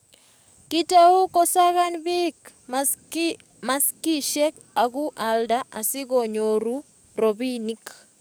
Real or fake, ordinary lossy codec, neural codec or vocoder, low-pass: fake; none; codec, 44.1 kHz, 7.8 kbps, DAC; none